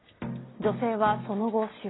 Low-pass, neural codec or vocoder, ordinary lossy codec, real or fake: 7.2 kHz; none; AAC, 16 kbps; real